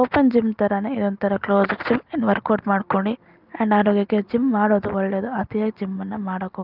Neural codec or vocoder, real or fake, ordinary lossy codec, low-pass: none; real; Opus, 32 kbps; 5.4 kHz